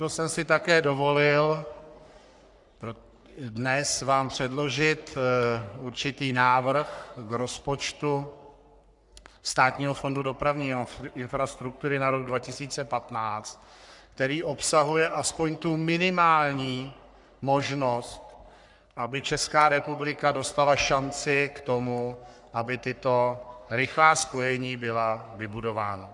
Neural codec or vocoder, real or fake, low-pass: codec, 44.1 kHz, 3.4 kbps, Pupu-Codec; fake; 10.8 kHz